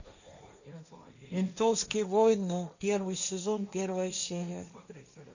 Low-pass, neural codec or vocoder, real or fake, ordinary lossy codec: 7.2 kHz; codec, 16 kHz, 1.1 kbps, Voila-Tokenizer; fake; none